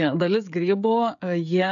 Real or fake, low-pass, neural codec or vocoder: fake; 7.2 kHz; codec, 16 kHz, 16 kbps, FreqCodec, smaller model